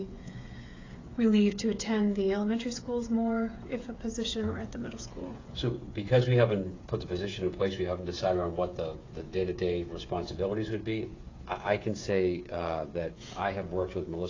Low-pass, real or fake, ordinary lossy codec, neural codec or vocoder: 7.2 kHz; fake; AAC, 32 kbps; codec, 16 kHz, 8 kbps, FreqCodec, smaller model